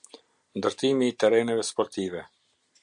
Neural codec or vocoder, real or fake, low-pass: none; real; 9.9 kHz